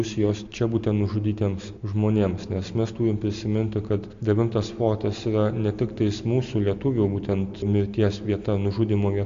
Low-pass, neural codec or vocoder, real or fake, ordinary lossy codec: 7.2 kHz; none; real; AAC, 64 kbps